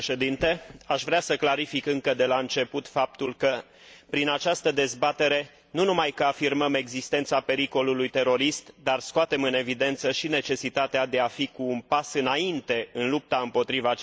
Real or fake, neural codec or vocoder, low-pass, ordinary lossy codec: real; none; none; none